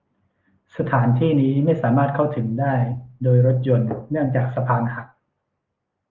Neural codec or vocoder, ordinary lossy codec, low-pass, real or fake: none; Opus, 24 kbps; 7.2 kHz; real